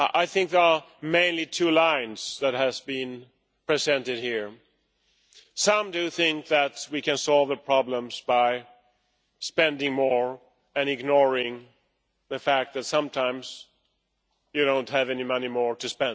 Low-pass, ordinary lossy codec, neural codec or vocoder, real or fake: none; none; none; real